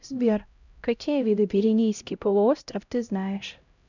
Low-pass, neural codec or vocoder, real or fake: 7.2 kHz; codec, 16 kHz, 0.5 kbps, X-Codec, HuBERT features, trained on LibriSpeech; fake